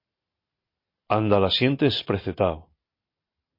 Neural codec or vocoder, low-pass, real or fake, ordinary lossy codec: none; 5.4 kHz; real; MP3, 32 kbps